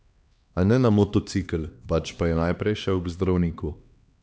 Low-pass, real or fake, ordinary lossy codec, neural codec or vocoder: none; fake; none; codec, 16 kHz, 2 kbps, X-Codec, HuBERT features, trained on LibriSpeech